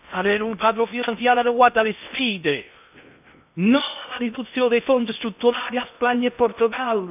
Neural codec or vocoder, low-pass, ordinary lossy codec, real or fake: codec, 16 kHz in and 24 kHz out, 0.6 kbps, FocalCodec, streaming, 2048 codes; 3.6 kHz; none; fake